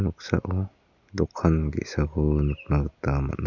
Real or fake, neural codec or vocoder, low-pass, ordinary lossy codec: real; none; 7.2 kHz; none